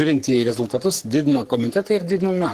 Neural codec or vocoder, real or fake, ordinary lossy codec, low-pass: codec, 44.1 kHz, 3.4 kbps, Pupu-Codec; fake; Opus, 16 kbps; 14.4 kHz